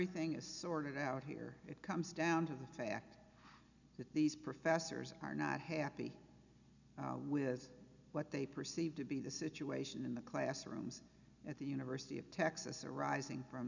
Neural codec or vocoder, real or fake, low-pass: none; real; 7.2 kHz